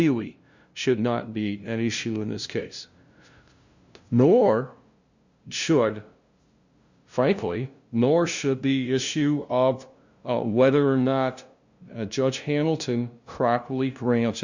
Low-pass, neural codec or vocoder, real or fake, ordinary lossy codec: 7.2 kHz; codec, 16 kHz, 0.5 kbps, FunCodec, trained on LibriTTS, 25 frames a second; fake; Opus, 64 kbps